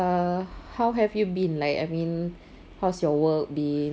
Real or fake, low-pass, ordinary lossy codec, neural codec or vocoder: real; none; none; none